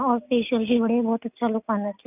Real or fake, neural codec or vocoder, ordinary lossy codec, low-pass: real; none; none; 3.6 kHz